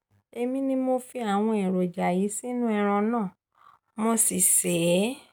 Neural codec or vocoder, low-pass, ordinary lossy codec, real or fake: none; none; none; real